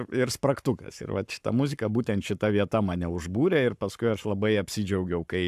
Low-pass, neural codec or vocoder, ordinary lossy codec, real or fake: 14.4 kHz; codec, 44.1 kHz, 7.8 kbps, Pupu-Codec; AAC, 96 kbps; fake